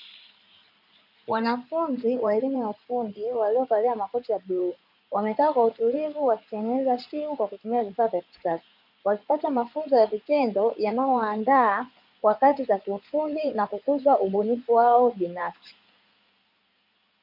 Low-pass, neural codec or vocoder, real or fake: 5.4 kHz; codec, 16 kHz in and 24 kHz out, 2.2 kbps, FireRedTTS-2 codec; fake